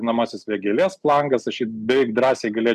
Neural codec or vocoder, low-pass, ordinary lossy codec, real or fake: none; 14.4 kHz; MP3, 96 kbps; real